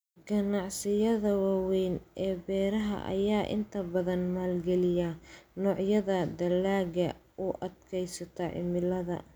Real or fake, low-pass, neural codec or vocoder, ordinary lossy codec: real; none; none; none